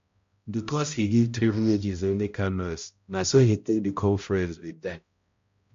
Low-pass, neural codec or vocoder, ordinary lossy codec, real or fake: 7.2 kHz; codec, 16 kHz, 0.5 kbps, X-Codec, HuBERT features, trained on balanced general audio; MP3, 48 kbps; fake